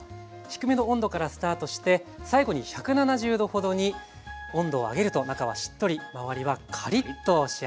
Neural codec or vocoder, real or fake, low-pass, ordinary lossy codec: none; real; none; none